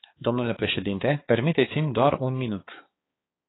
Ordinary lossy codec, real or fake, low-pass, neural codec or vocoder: AAC, 16 kbps; fake; 7.2 kHz; codec, 16 kHz, 4 kbps, X-Codec, HuBERT features, trained on balanced general audio